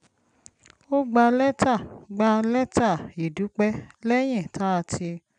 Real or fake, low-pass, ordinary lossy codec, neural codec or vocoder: real; 9.9 kHz; none; none